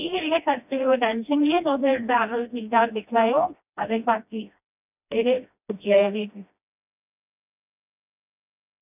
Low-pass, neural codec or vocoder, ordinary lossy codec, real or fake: 3.6 kHz; codec, 16 kHz, 1 kbps, FreqCodec, smaller model; none; fake